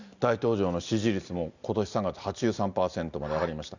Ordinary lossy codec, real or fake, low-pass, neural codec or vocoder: none; real; 7.2 kHz; none